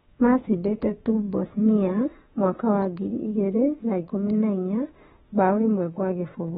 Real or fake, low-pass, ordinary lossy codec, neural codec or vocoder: fake; 19.8 kHz; AAC, 16 kbps; autoencoder, 48 kHz, 32 numbers a frame, DAC-VAE, trained on Japanese speech